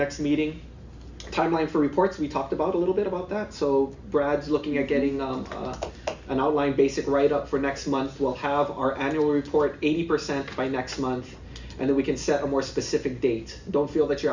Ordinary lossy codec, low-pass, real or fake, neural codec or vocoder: Opus, 64 kbps; 7.2 kHz; real; none